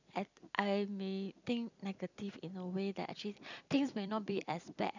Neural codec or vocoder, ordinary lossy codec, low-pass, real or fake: vocoder, 44.1 kHz, 128 mel bands every 256 samples, BigVGAN v2; none; 7.2 kHz; fake